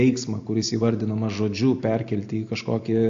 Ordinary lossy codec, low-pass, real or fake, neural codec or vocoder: AAC, 48 kbps; 7.2 kHz; real; none